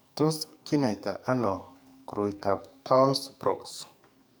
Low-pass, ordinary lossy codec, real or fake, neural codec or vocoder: none; none; fake; codec, 44.1 kHz, 2.6 kbps, SNAC